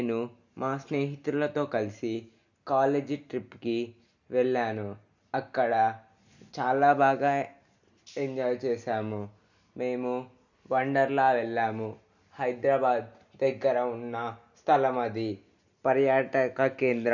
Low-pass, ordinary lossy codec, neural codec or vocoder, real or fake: 7.2 kHz; none; none; real